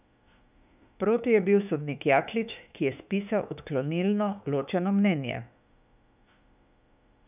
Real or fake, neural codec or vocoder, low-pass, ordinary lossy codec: fake; autoencoder, 48 kHz, 32 numbers a frame, DAC-VAE, trained on Japanese speech; 3.6 kHz; none